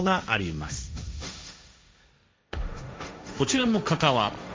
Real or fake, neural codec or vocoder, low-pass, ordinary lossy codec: fake; codec, 16 kHz, 1.1 kbps, Voila-Tokenizer; none; none